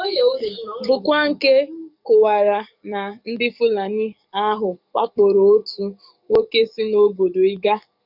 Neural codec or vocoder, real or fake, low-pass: codec, 44.1 kHz, 7.8 kbps, DAC; fake; 5.4 kHz